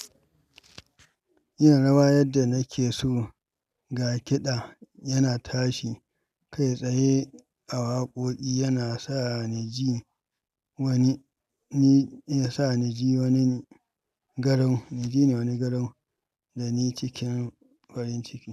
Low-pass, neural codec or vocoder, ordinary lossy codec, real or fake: 14.4 kHz; none; none; real